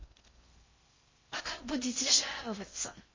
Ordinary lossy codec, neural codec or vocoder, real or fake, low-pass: MP3, 32 kbps; codec, 16 kHz in and 24 kHz out, 0.6 kbps, FocalCodec, streaming, 4096 codes; fake; 7.2 kHz